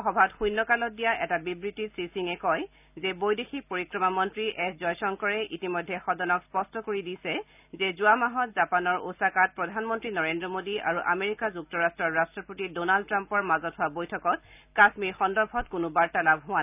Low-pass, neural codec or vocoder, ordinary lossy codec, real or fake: 3.6 kHz; none; none; real